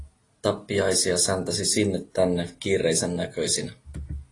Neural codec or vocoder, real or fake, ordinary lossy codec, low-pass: none; real; AAC, 32 kbps; 10.8 kHz